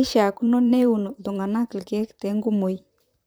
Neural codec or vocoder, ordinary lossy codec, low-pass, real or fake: vocoder, 44.1 kHz, 128 mel bands, Pupu-Vocoder; none; none; fake